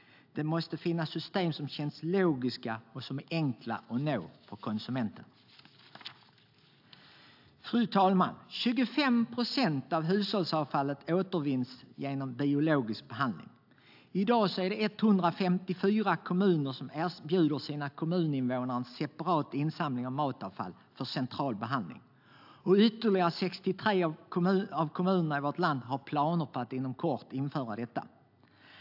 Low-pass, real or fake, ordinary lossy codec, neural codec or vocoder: 5.4 kHz; real; AAC, 48 kbps; none